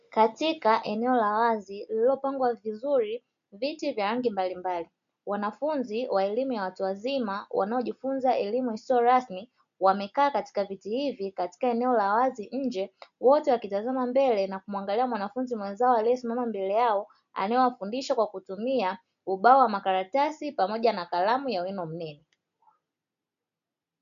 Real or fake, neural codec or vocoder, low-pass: real; none; 7.2 kHz